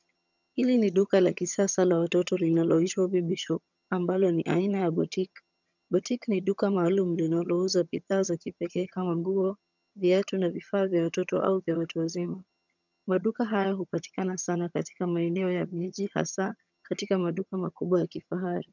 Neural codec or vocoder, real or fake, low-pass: vocoder, 22.05 kHz, 80 mel bands, HiFi-GAN; fake; 7.2 kHz